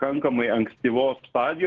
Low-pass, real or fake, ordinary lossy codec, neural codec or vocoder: 7.2 kHz; real; Opus, 16 kbps; none